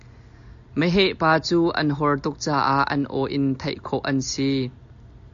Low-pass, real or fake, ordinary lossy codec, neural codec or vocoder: 7.2 kHz; real; AAC, 64 kbps; none